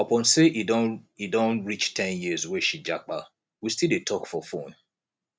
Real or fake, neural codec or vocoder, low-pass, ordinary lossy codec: real; none; none; none